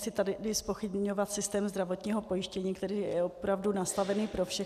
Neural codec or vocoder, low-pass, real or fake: none; 14.4 kHz; real